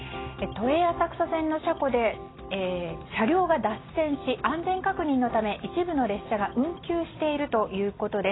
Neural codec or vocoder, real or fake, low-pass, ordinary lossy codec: none; real; 7.2 kHz; AAC, 16 kbps